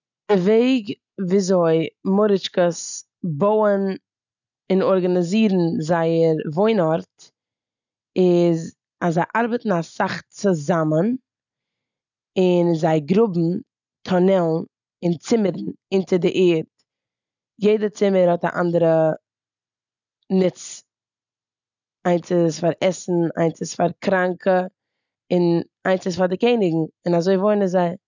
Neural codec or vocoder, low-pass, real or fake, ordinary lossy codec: none; 7.2 kHz; real; none